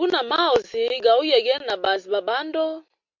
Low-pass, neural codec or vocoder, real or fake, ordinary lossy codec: 7.2 kHz; none; real; MP3, 48 kbps